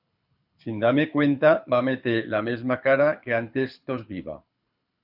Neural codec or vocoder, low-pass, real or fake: codec, 24 kHz, 6 kbps, HILCodec; 5.4 kHz; fake